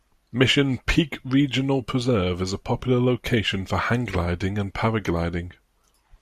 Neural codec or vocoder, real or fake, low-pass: none; real; 14.4 kHz